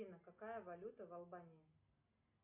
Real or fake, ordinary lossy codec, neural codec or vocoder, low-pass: real; AAC, 32 kbps; none; 3.6 kHz